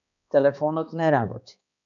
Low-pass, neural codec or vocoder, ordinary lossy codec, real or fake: 7.2 kHz; codec, 16 kHz, 2 kbps, X-Codec, HuBERT features, trained on balanced general audio; MP3, 96 kbps; fake